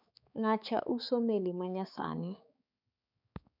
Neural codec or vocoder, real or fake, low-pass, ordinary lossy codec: codec, 16 kHz, 4 kbps, X-Codec, HuBERT features, trained on balanced general audio; fake; 5.4 kHz; none